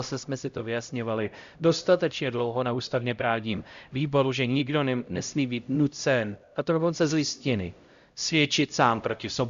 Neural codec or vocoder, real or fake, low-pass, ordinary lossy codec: codec, 16 kHz, 0.5 kbps, X-Codec, HuBERT features, trained on LibriSpeech; fake; 7.2 kHz; Opus, 64 kbps